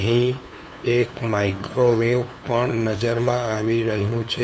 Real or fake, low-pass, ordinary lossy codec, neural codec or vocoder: fake; none; none; codec, 16 kHz, 2 kbps, FunCodec, trained on LibriTTS, 25 frames a second